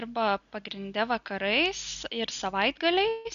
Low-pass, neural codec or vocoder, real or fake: 7.2 kHz; none; real